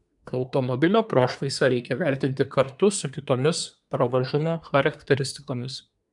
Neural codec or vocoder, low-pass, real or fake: codec, 24 kHz, 1 kbps, SNAC; 10.8 kHz; fake